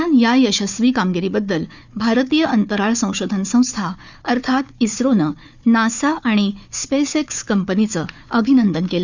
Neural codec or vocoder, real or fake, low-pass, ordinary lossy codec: codec, 16 kHz, 4 kbps, FunCodec, trained on Chinese and English, 50 frames a second; fake; 7.2 kHz; none